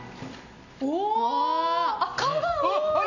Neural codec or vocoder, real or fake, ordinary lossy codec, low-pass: none; real; none; 7.2 kHz